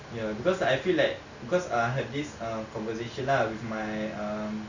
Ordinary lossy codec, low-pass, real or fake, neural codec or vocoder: none; 7.2 kHz; real; none